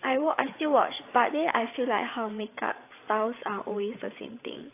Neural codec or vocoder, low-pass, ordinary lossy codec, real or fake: codec, 16 kHz, 8 kbps, FreqCodec, larger model; 3.6 kHz; AAC, 24 kbps; fake